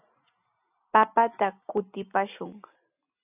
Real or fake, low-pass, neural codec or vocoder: real; 3.6 kHz; none